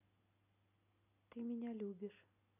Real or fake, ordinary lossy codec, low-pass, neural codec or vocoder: real; none; 3.6 kHz; none